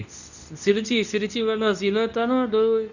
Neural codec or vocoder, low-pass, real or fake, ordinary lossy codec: codec, 24 kHz, 0.9 kbps, WavTokenizer, medium speech release version 2; 7.2 kHz; fake; none